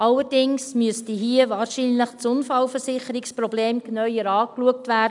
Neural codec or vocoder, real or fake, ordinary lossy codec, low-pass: none; real; none; 10.8 kHz